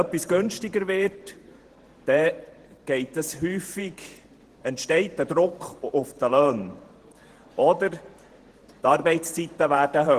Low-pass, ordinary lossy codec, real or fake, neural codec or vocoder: 14.4 kHz; Opus, 16 kbps; fake; vocoder, 48 kHz, 128 mel bands, Vocos